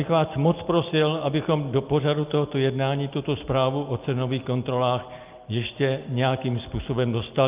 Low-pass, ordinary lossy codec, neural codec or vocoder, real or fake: 3.6 kHz; Opus, 24 kbps; none; real